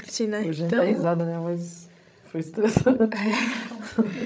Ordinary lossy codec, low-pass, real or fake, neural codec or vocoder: none; none; fake; codec, 16 kHz, 16 kbps, FreqCodec, larger model